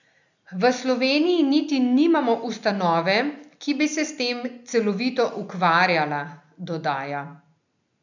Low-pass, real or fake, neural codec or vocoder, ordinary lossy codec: 7.2 kHz; real; none; none